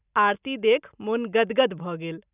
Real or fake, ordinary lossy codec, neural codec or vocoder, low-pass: real; none; none; 3.6 kHz